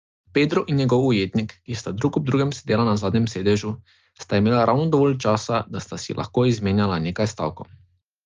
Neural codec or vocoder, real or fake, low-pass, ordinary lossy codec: none; real; 7.2 kHz; Opus, 32 kbps